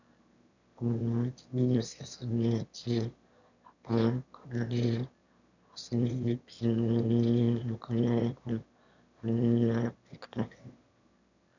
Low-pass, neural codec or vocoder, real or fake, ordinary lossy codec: 7.2 kHz; autoencoder, 22.05 kHz, a latent of 192 numbers a frame, VITS, trained on one speaker; fake; none